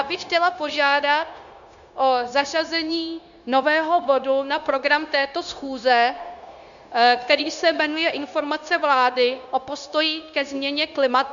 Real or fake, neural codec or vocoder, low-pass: fake; codec, 16 kHz, 0.9 kbps, LongCat-Audio-Codec; 7.2 kHz